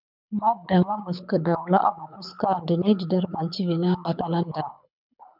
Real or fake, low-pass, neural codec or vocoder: fake; 5.4 kHz; codec, 24 kHz, 6 kbps, HILCodec